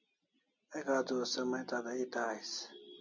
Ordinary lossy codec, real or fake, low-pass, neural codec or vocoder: MP3, 64 kbps; real; 7.2 kHz; none